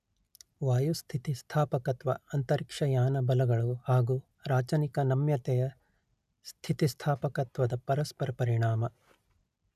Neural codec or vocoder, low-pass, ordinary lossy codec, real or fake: none; 14.4 kHz; none; real